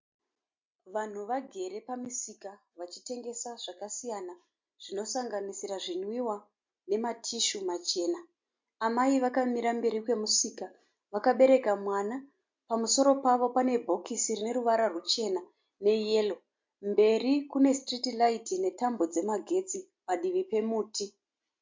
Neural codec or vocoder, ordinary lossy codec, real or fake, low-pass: none; MP3, 48 kbps; real; 7.2 kHz